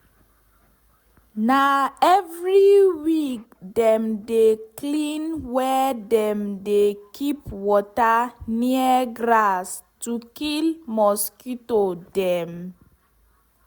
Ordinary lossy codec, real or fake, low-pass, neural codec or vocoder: none; real; none; none